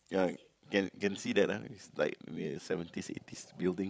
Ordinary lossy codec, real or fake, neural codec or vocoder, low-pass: none; fake; codec, 16 kHz, 16 kbps, FreqCodec, larger model; none